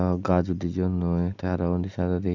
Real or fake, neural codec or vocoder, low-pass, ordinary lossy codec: real; none; 7.2 kHz; none